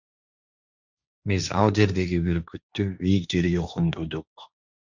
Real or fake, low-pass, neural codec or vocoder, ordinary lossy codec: fake; 7.2 kHz; codec, 16 kHz, 1.1 kbps, Voila-Tokenizer; Opus, 64 kbps